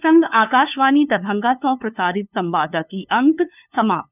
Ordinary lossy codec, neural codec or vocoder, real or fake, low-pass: none; codec, 16 kHz, 2 kbps, FunCodec, trained on LibriTTS, 25 frames a second; fake; 3.6 kHz